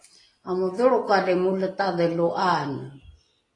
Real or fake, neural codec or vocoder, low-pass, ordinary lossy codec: real; none; 10.8 kHz; AAC, 32 kbps